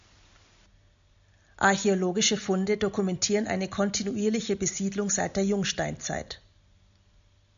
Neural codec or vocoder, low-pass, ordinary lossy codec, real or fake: none; 7.2 kHz; MP3, 64 kbps; real